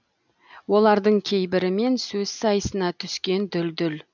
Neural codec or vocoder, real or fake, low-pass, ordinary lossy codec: none; real; 7.2 kHz; none